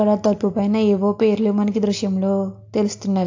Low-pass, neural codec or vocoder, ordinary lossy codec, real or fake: 7.2 kHz; none; AAC, 48 kbps; real